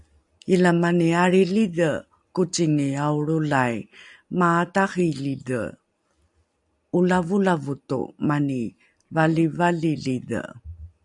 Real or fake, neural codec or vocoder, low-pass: real; none; 10.8 kHz